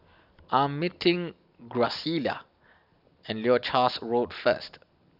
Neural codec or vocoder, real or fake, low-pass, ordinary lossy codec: none; real; 5.4 kHz; none